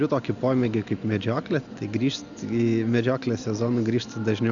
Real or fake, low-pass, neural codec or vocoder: real; 7.2 kHz; none